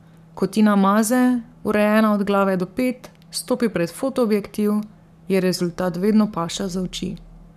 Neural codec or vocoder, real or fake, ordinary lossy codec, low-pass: codec, 44.1 kHz, 7.8 kbps, Pupu-Codec; fake; none; 14.4 kHz